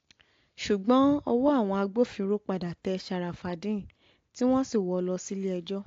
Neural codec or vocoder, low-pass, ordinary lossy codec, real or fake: none; 7.2 kHz; AAC, 48 kbps; real